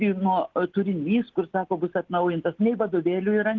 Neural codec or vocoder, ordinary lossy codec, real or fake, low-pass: none; Opus, 16 kbps; real; 7.2 kHz